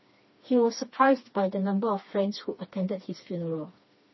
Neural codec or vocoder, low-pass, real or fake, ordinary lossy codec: codec, 16 kHz, 2 kbps, FreqCodec, smaller model; 7.2 kHz; fake; MP3, 24 kbps